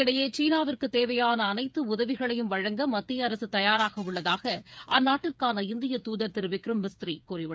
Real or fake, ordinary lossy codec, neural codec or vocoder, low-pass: fake; none; codec, 16 kHz, 8 kbps, FreqCodec, smaller model; none